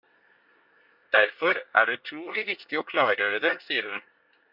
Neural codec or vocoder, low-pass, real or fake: codec, 24 kHz, 1 kbps, SNAC; 5.4 kHz; fake